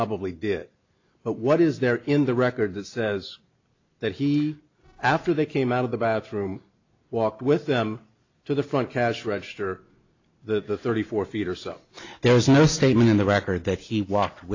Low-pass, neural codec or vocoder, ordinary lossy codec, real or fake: 7.2 kHz; none; AAC, 48 kbps; real